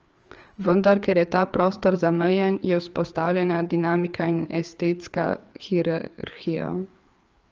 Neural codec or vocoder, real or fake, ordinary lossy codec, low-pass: codec, 16 kHz, 4 kbps, FreqCodec, larger model; fake; Opus, 24 kbps; 7.2 kHz